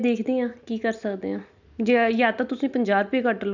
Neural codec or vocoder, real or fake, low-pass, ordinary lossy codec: none; real; 7.2 kHz; none